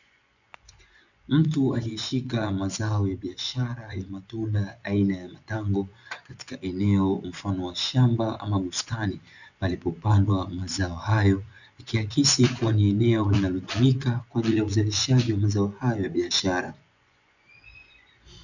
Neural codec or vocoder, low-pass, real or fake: vocoder, 24 kHz, 100 mel bands, Vocos; 7.2 kHz; fake